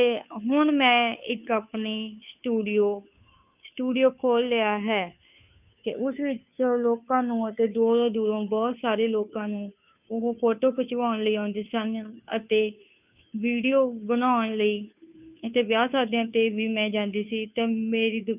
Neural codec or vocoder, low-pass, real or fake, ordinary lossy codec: codec, 16 kHz, 2 kbps, FunCodec, trained on Chinese and English, 25 frames a second; 3.6 kHz; fake; none